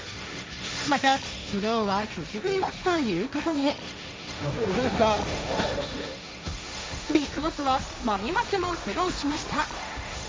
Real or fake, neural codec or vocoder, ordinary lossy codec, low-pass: fake; codec, 16 kHz, 1.1 kbps, Voila-Tokenizer; none; none